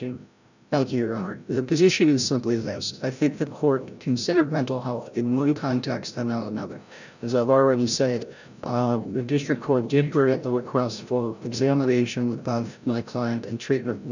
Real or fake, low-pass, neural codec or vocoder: fake; 7.2 kHz; codec, 16 kHz, 0.5 kbps, FreqCodec, larger model